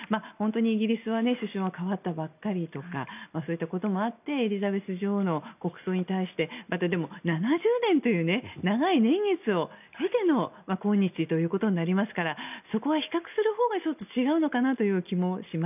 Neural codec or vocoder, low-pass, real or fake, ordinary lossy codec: none; 3.6 kHz; real; none